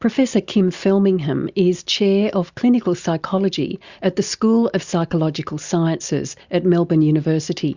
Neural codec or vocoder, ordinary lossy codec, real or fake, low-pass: none; Opus, 64 kbps; real; 7.2 kHz